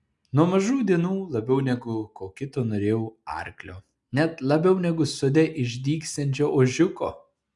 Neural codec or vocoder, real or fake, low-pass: none; real; 10.8 kHz